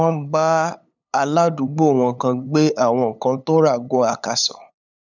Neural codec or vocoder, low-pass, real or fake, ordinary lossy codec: codec, 16 kHz, 8 kbps, FunCodec, trained on LibriTTS, 25 frames a second; 7.2 kHz; fake; none